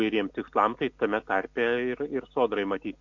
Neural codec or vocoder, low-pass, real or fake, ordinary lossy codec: none; 7.2 kHz; real; MP3, 48 kbps